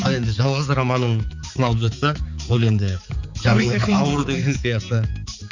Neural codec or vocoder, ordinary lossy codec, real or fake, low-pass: codec, 16 kHz, 4 kbps, X-Codec, HuBERT features, trained on balanced general audio; none; fake; 7.2 kHz